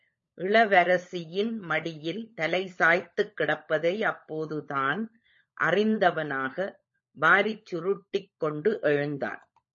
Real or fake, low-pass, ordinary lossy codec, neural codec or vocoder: fake; 7.2 kHz; MP3, 32 kbps; codec, 16 kHz, 16 kbps, FunCodec, trained on LibriTTS, 50 frames a second